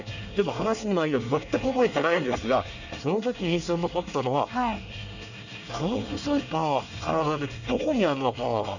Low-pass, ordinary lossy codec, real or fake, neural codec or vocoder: 7.2 kHz; none; fake; codec, 24 kHz, 1 kbps, SNAC